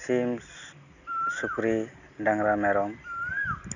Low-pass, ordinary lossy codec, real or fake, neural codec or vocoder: 7.2 kHz; none; real; none